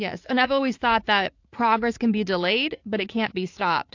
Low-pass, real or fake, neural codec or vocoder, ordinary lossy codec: 7.2 kHz; fake; vocoder, 44.1 kHz, 80 mel bands, Vocos; AAC, 48 kbps